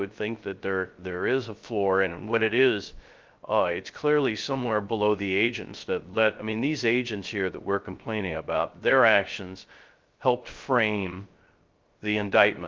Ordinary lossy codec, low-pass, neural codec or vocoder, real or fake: Opus, 24 kbps; 7.2 kHz; codec, 16 kHz, 0.3 kbps, FocalCodec; fake